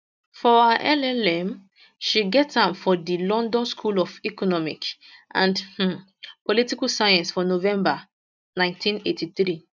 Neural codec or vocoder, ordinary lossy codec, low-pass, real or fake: none; none; 7.2 kHz; real